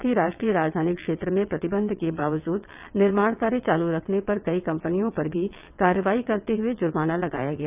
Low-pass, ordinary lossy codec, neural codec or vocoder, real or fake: 3.6 kHz; none; vocoder, 22.05 kHz, 80 mel bands, WaveNeXt; fake